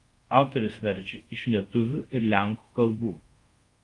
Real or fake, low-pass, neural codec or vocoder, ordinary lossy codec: fake; 10.8 kHz; codec, 24 kHz, 0.5 kbps, DualCodec; Opus, 32 kbps